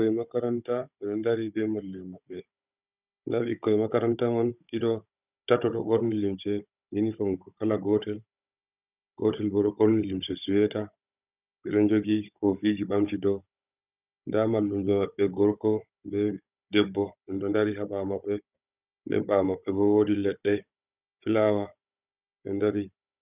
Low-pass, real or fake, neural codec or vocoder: 3.6 kHz; fake; codec, 16 kHz, 16 kbps, FunCodec, trained on Chinese and English, 50 frames a second